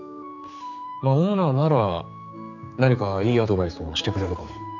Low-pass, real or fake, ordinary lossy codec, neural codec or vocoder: 7.2 kHz; fake; none; codec, 16 kHz, 4 kbps, X-Codec, HuBERT features, trained on general audio